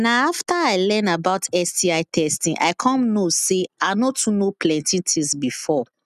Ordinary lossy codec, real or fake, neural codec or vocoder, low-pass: none; real; none; 14.4 kHz